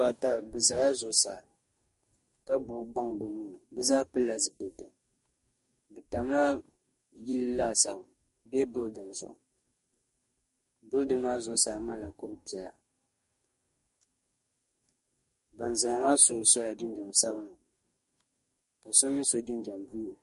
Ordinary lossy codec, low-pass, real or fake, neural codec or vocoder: MP3, 48 kbps; 14.4 kHz; fake; codec, 44.1 kHz, 2.6 kbps, DAC